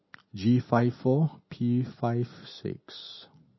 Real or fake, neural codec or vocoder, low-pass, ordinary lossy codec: real; none; 7.2 kHz; MP3, 24 kbps